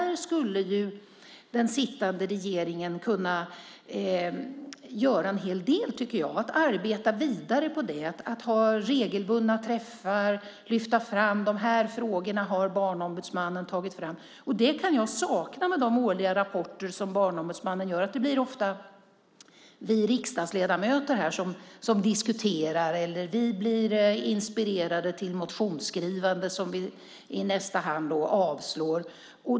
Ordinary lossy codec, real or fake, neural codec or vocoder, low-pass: none; real; none; none